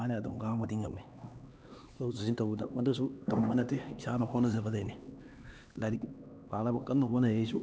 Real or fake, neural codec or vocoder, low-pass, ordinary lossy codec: fake; codec, 16 kHz, 2 kbps, X-Codec, HuBERT features, trained on LibriSpeech; none; none